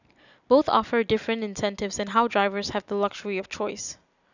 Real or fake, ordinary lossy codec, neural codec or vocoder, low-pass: real; none; none; 7.2 kHz